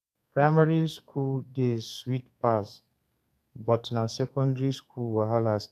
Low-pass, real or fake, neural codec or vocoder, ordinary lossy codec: 14.4 kHz; fake; codec, 32 kHz, 1.9 kbps, SNAC; none